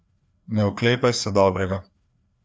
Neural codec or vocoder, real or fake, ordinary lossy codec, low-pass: codec, 16 kHz, 2 kbps, FreqCodec, larger model; fake; none; none